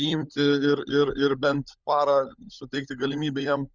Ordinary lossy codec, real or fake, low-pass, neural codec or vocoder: Opus, 64 kbps; fake; 7.2 kHz; codec, 16 kHz, 16 kbps, FunCodec, trained on LibriTTS, 50 frames a second